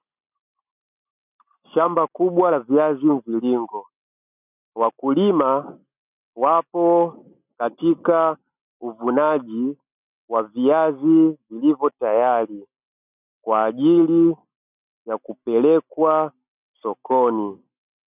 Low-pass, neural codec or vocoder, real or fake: 3.6 kHz; none; real